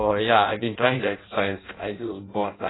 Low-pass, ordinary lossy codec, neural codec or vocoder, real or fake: 7.2 kHz; AAC, 16 kbps; codec, 16 kHz in and 24 kHz out, 0.6 kbps, FireRedTTS-2 codec; fake